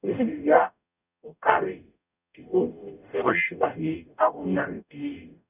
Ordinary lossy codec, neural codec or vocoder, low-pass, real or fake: none; codec, 44.1 kHz, 0.9 kbps, DAC; 3.6 kHz; fake